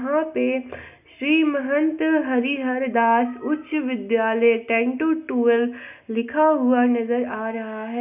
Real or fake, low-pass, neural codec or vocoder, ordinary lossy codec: real; 3.6 kHz; none; none